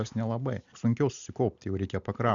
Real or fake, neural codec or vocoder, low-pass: real; none; 7.2 kHz